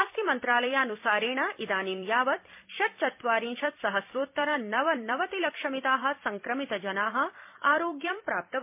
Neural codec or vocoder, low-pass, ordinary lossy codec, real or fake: vocoder, 44.1 kHz, 128 mel bands every 256 samples, BigVGAN v2; 3.6 kHz; MP3, 32 kbps; fake